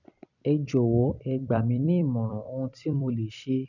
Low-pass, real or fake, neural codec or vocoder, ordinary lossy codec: 7.2 kHz; fake; vocoder, 44.1 kHz, 128 mel bands every 256 samples, BigVGAN v2; none